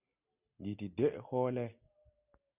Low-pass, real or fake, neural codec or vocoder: 3.6 kHz; real; none